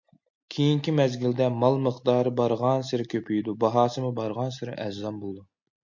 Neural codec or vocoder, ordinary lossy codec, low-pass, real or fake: none; MP3, 48 kbps; 7.2 kHz; real